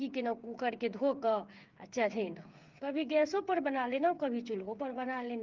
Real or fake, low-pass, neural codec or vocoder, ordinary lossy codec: fake; 7.2 kHz; codec, 16 kHz, 8 kbps, FreqCodec, smaller model; Opus, 32 kbps